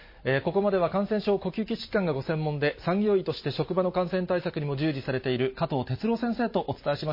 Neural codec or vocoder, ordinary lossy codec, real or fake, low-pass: none; MP3, 24 kbps; real; 5.4 kHz